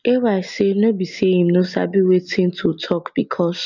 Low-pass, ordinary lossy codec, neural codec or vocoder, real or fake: 7.2 kHz; none; none; real